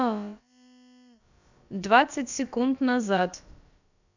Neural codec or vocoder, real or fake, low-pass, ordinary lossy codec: codec, 16 kHz, about 1 kbps, DyCAST, with the encoder's durations; fake; 7.2 kHz; none